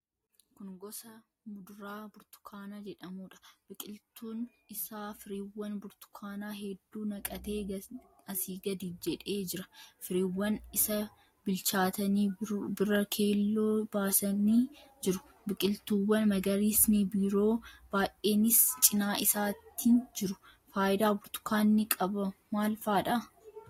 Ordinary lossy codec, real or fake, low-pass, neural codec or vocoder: AAC, 48 kbps; real; 19.8 kHz; none